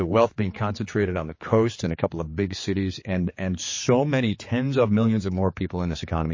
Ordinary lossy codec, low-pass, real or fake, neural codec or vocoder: MP3, 32 kbps; 7.2 kHz; fake; codec, 16 kHz, 4 kbps, X-Codec, HuBERT features, trained on general audio